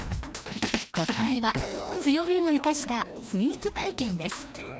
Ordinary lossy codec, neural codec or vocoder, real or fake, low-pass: none; codec, 16 kHz, 1 kbps, FreqCodec, larger model; fake; none